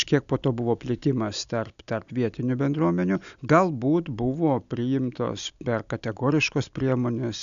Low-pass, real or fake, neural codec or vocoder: 7.2 kHz; real; none